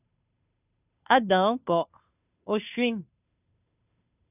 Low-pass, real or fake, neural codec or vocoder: 3.6 kHz; fake; codec, 16 kHz, 2 kbps, FunCodec, trained on Chinese and English, 25 frames a second